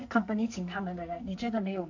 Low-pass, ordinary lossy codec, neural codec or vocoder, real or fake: 7.2 kHz; AAC, 48 kbps; codec, 44.1 kHz, 2.6 kbps, SNAC; fake